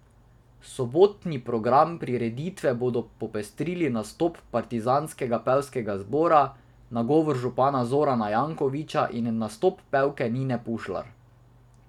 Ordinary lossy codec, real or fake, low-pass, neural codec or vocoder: none; real; 19.8 kHz; none